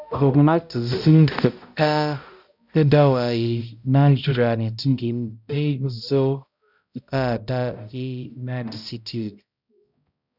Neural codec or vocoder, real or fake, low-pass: codec, 16 kHz, 0.5 kbps, X-Codec, HuBERT features, trained on balanced general audio; fake; 5.4 kHz